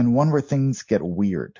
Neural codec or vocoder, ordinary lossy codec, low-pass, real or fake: none; MP3, 48 kbps; 7.2 kHz; real